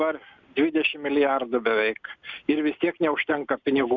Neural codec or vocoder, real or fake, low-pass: none; real; 7.2 kHz